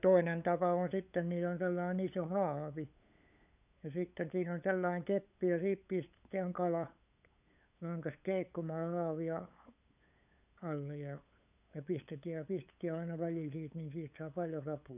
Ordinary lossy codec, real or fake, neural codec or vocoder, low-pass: none; fake; codec, 16 kHz, 8 kbps, FunCodec, trained on LibriTTS, 25 frames a second; 3.6 kHz